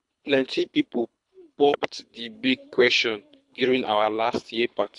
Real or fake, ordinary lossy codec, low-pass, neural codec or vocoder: fake; AAC, 64 kbps; 10.8 kHz; codec, 24 kHz, 3 kbps, HILCodec